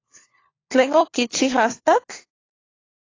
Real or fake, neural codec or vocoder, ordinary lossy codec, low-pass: fake; codec, 16 kHz, 4 kbps, FunCodec, trained on LibriTTS, 50 frames a second; AAC, 32 kbps; 7.2 kHz